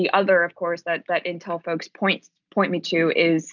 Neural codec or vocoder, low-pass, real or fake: none; 7.2 kHz; real